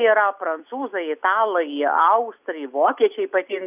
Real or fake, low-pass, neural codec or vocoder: real; 3.6 kHz; none